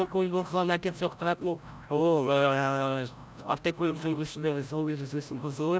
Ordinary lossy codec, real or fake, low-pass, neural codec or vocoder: none; fake; none; codec, 16 kHz, 0.5 kbps, FreqCodec, larger model